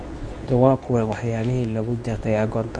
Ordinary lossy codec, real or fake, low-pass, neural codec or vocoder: none; fake; none; codec, 24 kHz, 0.9 kbps, WavTokenizer, medium speech release version 2